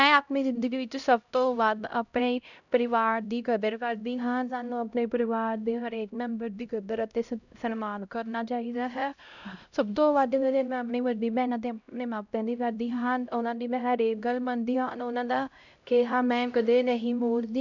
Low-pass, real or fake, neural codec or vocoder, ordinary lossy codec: 7.2 kHz; fake; codec, 16 kHz, 0.5 kbps, X-Codec, HuBERT features, trained on LibriSpeech; none